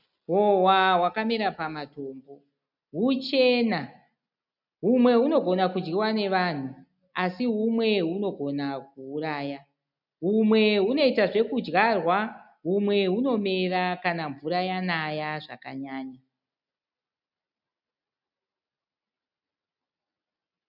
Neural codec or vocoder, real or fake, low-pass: none; real; 5.4 kHz